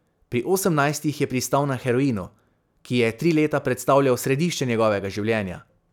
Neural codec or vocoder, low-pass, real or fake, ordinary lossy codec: none; 19.8 kHz; real; none